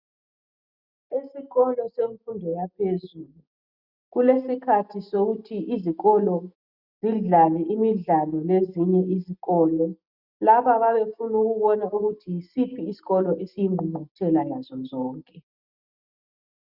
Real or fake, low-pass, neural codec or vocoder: real; 5.4 kHz; none